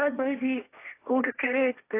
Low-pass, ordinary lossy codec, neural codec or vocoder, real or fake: 3.6 kHz; AAC, 24 kbps; codec, 16 kHz, 1.1 kbps, Voila-Tokenizer; fake